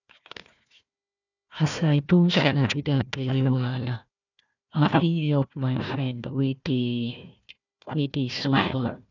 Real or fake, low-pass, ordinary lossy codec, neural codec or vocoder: fake; 7.2 kHz; none; codec, 16 kHz, 1 kbps, FunCodec, trained on Chinese and English, 50 frames a second